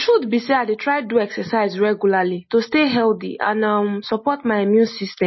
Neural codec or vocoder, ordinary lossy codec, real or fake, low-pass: none; MP3, 24 kbps; real; 7.2 kHz